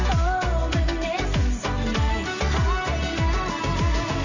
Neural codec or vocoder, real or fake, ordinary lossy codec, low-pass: none; real; none; 7.2 kHz